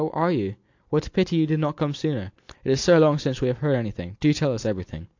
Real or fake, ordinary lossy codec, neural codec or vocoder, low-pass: real; AAC, 48 kbps; none; 7.2 kHz